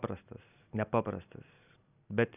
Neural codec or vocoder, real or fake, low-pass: none; real; 3.6 kHz